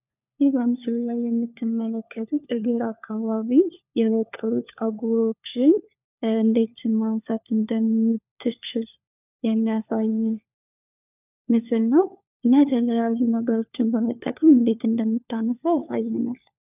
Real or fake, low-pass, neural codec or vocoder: fake; 3.6 kHz; codec, 16 kHz, 4 kbps, FunCodec, trained on LibriTTS, 50 frames a second